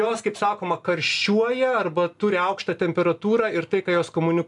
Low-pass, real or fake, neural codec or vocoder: 10.8 kHz; real; none